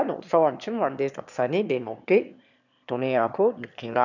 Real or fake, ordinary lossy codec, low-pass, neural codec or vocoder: fake; none; 7.2 kHz; autoencoder, 22.05 kHz, a latent of 192 numbers a frame, VITS, trained on one speaker